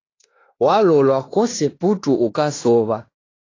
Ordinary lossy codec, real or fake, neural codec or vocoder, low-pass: AAC, 32 kbps; fake; codec, 16 kHz in and 24 kHz out, 0.9 kbps, LongCat-Audio-Codec, fine tuned four codebook decoder; 7.2 kHz